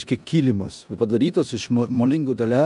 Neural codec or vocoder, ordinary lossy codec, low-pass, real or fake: codec, 16 kHz in and 24 kHz out, 0.9 kbps, LongCat-Audio-Codec, four codebook decoder; AAC, 96 kbps; 10.8 kHz; fake